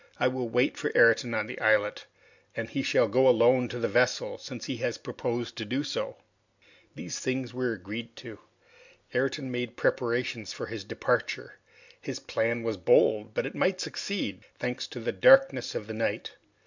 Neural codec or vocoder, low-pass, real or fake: none; 7.2 kHz; real